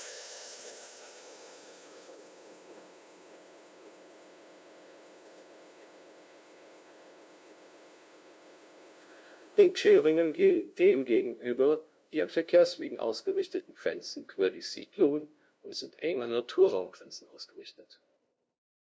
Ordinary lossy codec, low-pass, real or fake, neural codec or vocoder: none; none; fake; codec, 16 kHz, 0.5 kbps, FunCodec, trained on LibriTTS, 25 frames a second